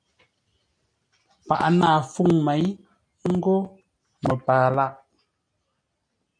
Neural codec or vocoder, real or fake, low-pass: none; real; 9.9 kHz